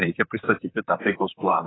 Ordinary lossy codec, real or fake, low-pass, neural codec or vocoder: AAC, 16 kbps; fake; 7.2 kHz; vocoder, 44.1 kHz, 128 mel bands, Pupu-Vocoder